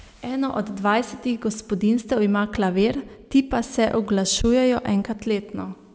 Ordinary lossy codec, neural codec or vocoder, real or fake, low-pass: none; none; real; none